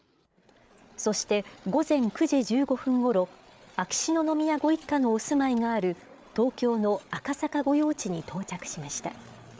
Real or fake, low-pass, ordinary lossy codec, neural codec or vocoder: fake; none; none; codec, 16 kHz, 8 kbps, FreqCodec, larger model